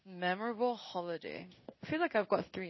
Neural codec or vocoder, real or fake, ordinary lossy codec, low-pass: codec, 16 kHz in and 24 kHz out, 1 kbps, XY-Tokenizer; fake; MP3, 24 kbps; 7.2 kHz